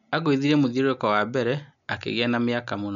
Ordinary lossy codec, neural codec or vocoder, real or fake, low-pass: none; none; real; 7.2 kHz